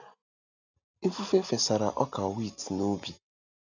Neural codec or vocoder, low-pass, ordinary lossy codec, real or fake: none; 7.2 kHz; none; real